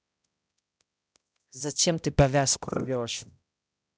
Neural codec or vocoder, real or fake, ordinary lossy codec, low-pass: codec, 16 kHz, 0.5 kbps, X-Codec, HuBERT features, trained on balanced general audio; fake; none; none